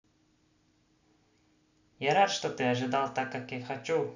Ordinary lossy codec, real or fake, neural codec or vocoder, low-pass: none; real; none; 7.2 kHz